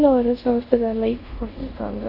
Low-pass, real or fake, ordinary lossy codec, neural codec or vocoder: 5.4 kHz; fake; none; codec, 24 kHz, 0.5 kbps, DualCodec